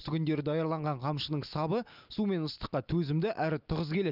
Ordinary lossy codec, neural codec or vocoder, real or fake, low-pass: Opus, 24 kbps; none; real; 5.4 kHz